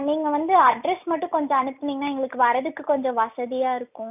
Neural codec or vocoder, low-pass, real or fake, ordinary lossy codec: none; 3.6 kHz; real; none